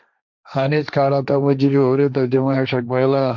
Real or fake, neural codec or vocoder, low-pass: fake; codec, 16 kHz, 1.1 kbps, Voila-Tokenizer; 7.2 kHz